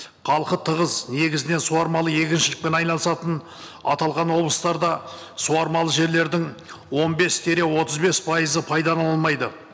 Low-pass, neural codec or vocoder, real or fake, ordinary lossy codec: none; none; real; none